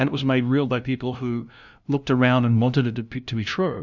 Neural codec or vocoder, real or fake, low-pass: codec, 16 kHz, 0.5 kbps, FunCodec, trained on LibriTTS, 25 frames a second; fake; 7.2 kHz